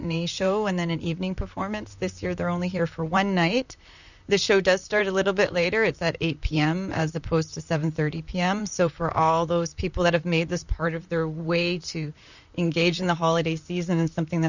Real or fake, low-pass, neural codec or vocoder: fake; 7.2 kHz; vocoder, 44.1 kHz, 128 mel bands, Pupu-Vocoder